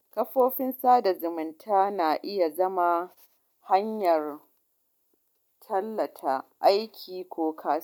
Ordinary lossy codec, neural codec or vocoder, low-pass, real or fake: none; none; 19.8 kHz; real